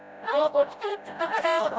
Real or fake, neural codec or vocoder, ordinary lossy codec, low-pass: fake; codec, 16 kHz, 0.5 kbps, FreqCodec, smaller model; none; none